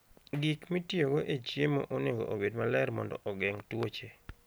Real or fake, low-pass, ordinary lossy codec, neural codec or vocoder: real; none; none; none